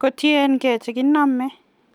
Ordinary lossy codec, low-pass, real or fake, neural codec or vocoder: none; 19.8 kHz; real; none